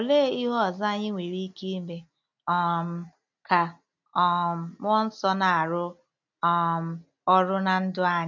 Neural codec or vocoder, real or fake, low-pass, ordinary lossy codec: none; real; 7.2 kHz; none